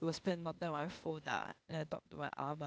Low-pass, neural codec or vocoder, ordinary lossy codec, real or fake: none; codec, 16 kHz, 0.8 kbps, ZipCodec; none; fake